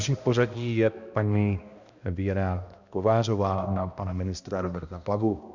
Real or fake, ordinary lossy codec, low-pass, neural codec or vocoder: fake; Opus, 64 kbps; 7.2 kHz; codec, 16 kHz, 1 kbps, X-Codec, HuBERT features, trained on balanced general audio